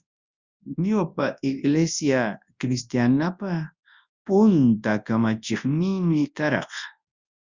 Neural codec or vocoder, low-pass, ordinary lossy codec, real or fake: codec, 24 kHz, 0.9 kbps, WavTokenizer, large speech release; 7.2 kHz; Opus, 64 kbps; fake